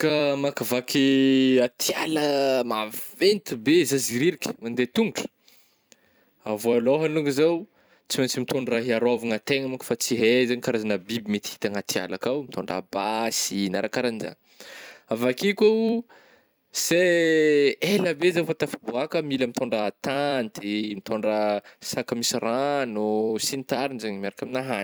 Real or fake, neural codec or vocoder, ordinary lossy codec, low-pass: fake; vocoder, 44.1 kHz, 128 mel bands every 256 samples, BigVGAN v2; none; none